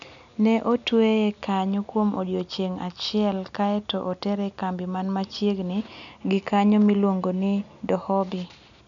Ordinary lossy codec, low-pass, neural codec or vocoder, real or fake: none; 7.2 kHz; none; real